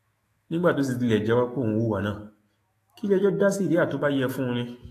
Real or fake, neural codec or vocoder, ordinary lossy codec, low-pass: fake; autoencoder, 48 kHz, 128 numbers a frame, DAC-VAE, trained on Japanese speech; AAC, 48 kbps; 14.4 kHz